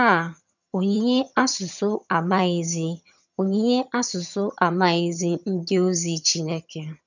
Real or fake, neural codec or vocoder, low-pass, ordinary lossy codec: fake; vocoder, 22.05 kHz, 80 mel bands, HiFi-GAN; 7.2 kHz; none